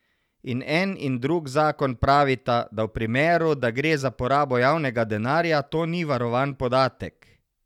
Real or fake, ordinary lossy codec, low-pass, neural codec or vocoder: real; none; 19.8 kHz; none